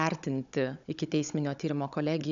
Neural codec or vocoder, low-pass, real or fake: codec, 16 kHz, 16 kbps, FunCodec, trained on Chinese and English, 50 frames a second; 7.2 kHz; fake